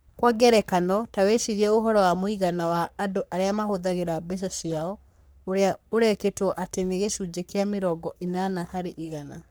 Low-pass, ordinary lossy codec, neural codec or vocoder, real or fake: none; none; codec, 44.1 kHz, 3.4 kbps, Pupu-Codec; fake